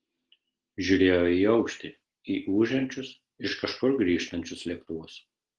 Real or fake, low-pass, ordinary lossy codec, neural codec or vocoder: real; 10.8 kHz; Opus, 16 kbps; none